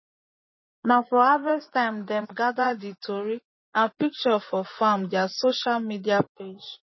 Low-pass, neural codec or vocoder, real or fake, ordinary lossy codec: 7.2 kHz; vocoder, 24 kHz, 100 mel bands, Vocos; fake; MP3, 24 kbps